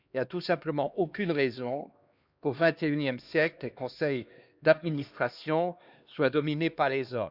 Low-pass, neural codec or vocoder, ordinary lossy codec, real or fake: 5.4 kHz; codec, 16 kHz, 2 kbps, X-Codec, HuBERT features, trained on LibriSpeech; Opus, 64 kbps; fake